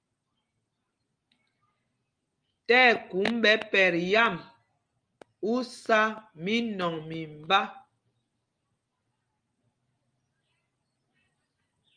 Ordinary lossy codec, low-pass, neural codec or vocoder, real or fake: Opus, 32 kbps; 9.9 kHz; none; real